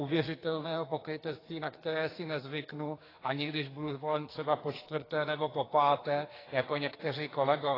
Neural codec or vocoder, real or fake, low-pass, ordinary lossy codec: codec, 44.1 kHz, 2.6 kbps, SNAC; fake; 5.4 kHz; AAC, 24 kbps